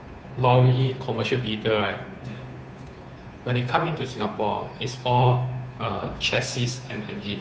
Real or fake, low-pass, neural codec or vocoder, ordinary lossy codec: fake; none; codec, 16 kHz, 2 kbps, FunCodec, trained on Chinese and English, 25 frames a second; none